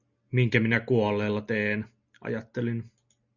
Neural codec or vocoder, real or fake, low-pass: none; real; 7.2 kHz